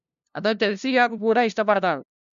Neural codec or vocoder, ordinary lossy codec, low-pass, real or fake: codec, 16 kHz, 0.5 kbps, FunCodec, trained on LibriTTS, 25 frames a second; none; 7.2 kHz; fake